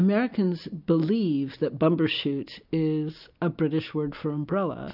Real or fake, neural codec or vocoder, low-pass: real; none; 5.4 kHz